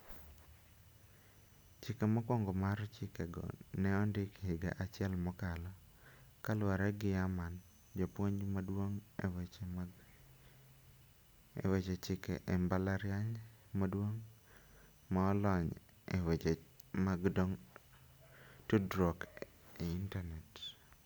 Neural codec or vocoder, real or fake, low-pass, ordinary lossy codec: none; real; none; none